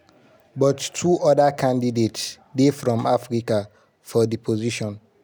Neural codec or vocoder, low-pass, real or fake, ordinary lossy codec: none; none; real; none